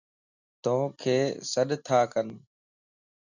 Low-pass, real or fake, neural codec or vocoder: 7.2 kHz; real; none